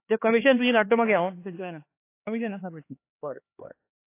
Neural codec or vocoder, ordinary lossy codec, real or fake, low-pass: codec, 16 kHz, 2 kbps, X-Codec, HuBERT features, trained on LibriSpeech; AAC, 24 kbps; fake; 3.6 kHz